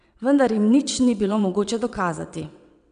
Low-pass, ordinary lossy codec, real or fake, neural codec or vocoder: 9.9 kHz; none; fake; vocoder, 22.05 kHz, 80 mel bands, WaveNeXt